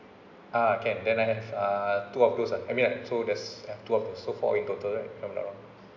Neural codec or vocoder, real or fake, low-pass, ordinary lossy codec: none; real; 7.2 kHz; none